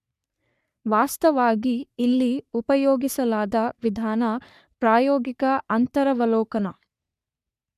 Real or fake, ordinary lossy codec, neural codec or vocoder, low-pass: fake; none; codec, 44.1 kHz, 3.4 kbps, Pupu-Codec; 14.4 kHz